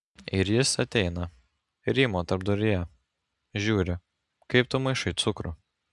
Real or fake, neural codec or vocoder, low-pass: real; none; 10.8 kHz